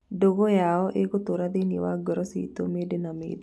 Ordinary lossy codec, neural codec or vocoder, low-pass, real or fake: none; none; none; real